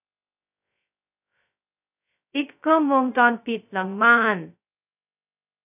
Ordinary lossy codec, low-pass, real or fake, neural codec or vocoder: none; 3.6 kHz; fake; codec, 16 kHz, 0.2 kbps, FocalCodec